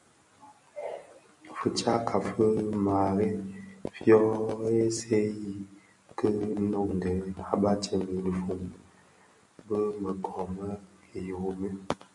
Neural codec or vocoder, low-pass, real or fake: none; 10.8 kHz; real